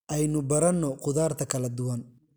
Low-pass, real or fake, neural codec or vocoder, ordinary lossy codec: none; real; none; none